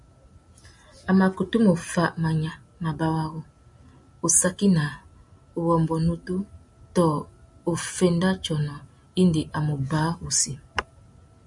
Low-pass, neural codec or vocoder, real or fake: 10.8 kHz; none; real